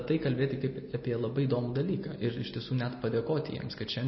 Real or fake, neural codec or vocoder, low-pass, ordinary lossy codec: real; none; 7.2 kHz; MP3, 24 kbps